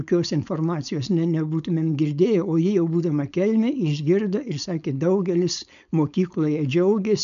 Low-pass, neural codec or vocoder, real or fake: 7.2 kHz; codec, 16 kHz, 4.8 kbps, FACodec; fake